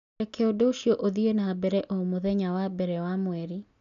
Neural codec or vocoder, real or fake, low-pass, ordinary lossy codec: none; real; 7.2 kHz; none